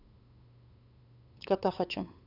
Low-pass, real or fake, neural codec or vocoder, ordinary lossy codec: 5.4 kHz; fake; codec, 16 kHz, 8 kbps, FunCodec, trained on LibriTTS, 25 frames a second; none